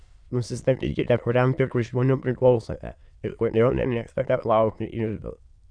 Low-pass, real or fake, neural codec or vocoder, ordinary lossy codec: 9.9 kHz; fake; autoencoder, 22.05 kHz, a latent of 192 numbers a frame, VITS, trained on many speakers; Opus, 64 kbps